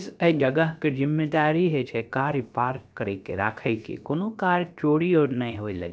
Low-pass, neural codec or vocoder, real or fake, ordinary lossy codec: none; codec, 16 kHz, about 1 kbps, DyCAST, with the encoder's durations; fake; none